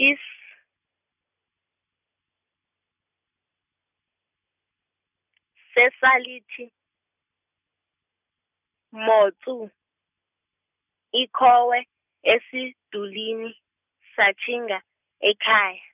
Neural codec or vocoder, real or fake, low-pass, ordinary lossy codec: none; real; 3.6 kHz; none